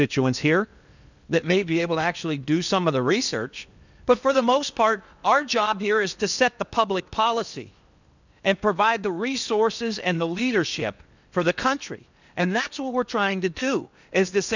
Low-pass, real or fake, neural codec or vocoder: 7.2 kHz; fake; codec, 16 kHz in and 24 kHz out, 0.8 kbps, FocalCodec, streaming, 65536 codes